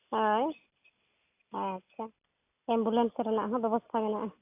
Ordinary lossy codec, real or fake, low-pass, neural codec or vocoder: AAC, 24 kbps; real; 3.6 kHz; none